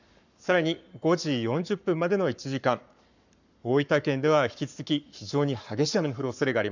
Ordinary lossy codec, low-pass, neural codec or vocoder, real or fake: none; 7.2 kHz; codec, 44.1 kHz, 7.8 kbps, Pupu-Codec; fake